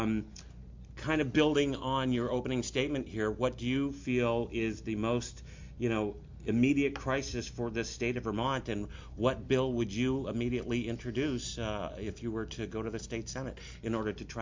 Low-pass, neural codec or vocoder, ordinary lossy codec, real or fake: 7.2 kHz; none; MP3, 48 kbps; real